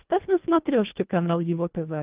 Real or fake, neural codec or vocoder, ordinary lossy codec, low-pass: fake; codec, 24 kHz, 1.5 kbps, HILCodec; Opus, 24 kbps; 3.6 kHz